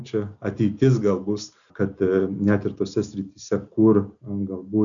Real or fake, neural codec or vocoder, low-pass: real; none; 7.2 kHz